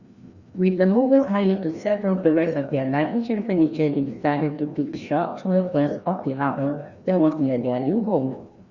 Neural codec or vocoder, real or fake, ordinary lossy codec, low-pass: codec, 16 kHz, 1 kbps, FreqCodec, larger model; fake; Opus, 64 kbps; 7.2 kHz